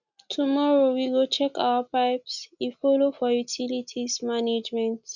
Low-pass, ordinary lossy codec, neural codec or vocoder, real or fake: 7.2 kHz; none; none; real